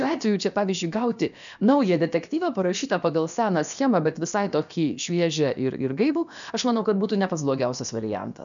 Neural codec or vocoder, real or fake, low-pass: codec, 16 kHz, about 1 kbps, DyCAST, with the encoder's durations; fake; 7.2 kHz